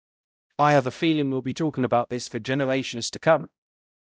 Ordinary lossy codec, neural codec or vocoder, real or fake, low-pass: none; codec, 16 kHz, 0.5 kbps, X-Codec, HuBERT features, trained on balanced general audio; fake; none